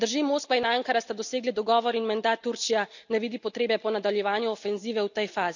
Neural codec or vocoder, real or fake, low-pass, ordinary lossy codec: none; real; 7.2 kHz; none